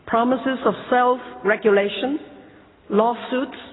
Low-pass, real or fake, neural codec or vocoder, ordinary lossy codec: 7.2 kHz; real; none; AAC, 16 kbps